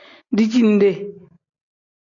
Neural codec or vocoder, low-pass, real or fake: none; 7.2 kHz; real